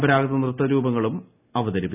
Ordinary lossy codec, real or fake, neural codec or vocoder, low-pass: none; real; none; 3.6 kHz